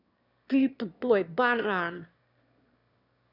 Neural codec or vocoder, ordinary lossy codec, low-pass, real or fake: autoencoder, 22.05 kHz, a latent of 192 numbers a frame, VITS, trained on one speaker; none; 5.4 kHz; fake